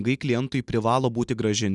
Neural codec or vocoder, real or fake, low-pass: none; real; 10.8 kHz